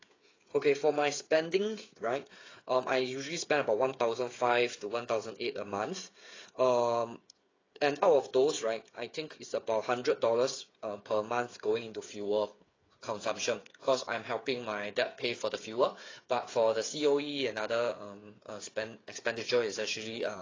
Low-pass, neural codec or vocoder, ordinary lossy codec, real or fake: 7.2 kHz; codec, 16 kHz, 8 kbps, FreqCodec, smaller model; AAC, 32 kbps; fake